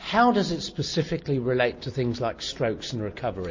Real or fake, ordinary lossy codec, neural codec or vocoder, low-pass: real; MP3, 32 kbps; none; 7.2 kHz